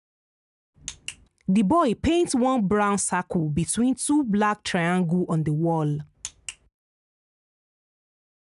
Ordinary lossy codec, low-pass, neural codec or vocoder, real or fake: none; 10.8 kHz; none; real